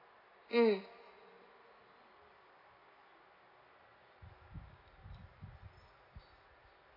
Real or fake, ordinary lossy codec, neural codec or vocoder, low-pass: real; AAC, 24 kbps; none; 5.4 kHz